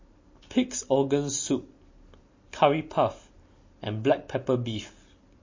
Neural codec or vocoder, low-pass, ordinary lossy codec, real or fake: none; 7.2 kHz; MP3, 32 kbps; real